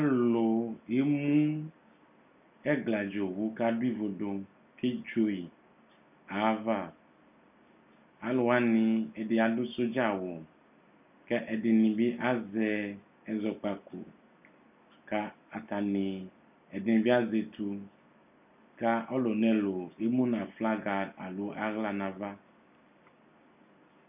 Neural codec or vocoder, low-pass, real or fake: none; 3.6 kHz; real